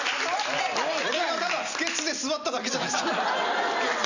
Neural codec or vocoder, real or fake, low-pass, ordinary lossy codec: none; real; 7.2 kHz; none